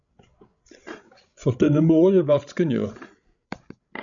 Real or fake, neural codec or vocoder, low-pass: fake; codec, 16 kHz, 16 kbps, FreqCodec, larger model; 7.2 kHz